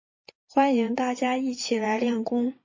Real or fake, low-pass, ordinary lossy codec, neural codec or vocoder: fake; 7.2 kHz; MP3, 32 kbps; vocoder, 22.05 kHz, 80 mel bands, Vocos